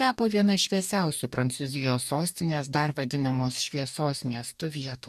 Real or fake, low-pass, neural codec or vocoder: fake; 14.4 kHz; codec, 44.1 kHz, 2.6 kbps, DAC